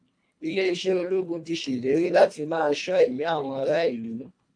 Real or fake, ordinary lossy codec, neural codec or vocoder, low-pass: fake; AAC, 64 kbps; codec, 24 kHz, 1.5 kbps, HILCodec; 9.9 kHz